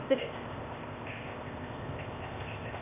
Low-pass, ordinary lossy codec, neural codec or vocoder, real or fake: 3.6 kHz; none; codec, 16 kHz, 0.8 kbps, ZipCodec; fake